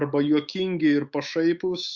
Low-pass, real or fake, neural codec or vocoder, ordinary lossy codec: 7.2 kHz; fake; autoencoder, 48 kHz, 128 numbers a frame, DAC-VAE, trained on Japanese speech; Opus, 64 kbps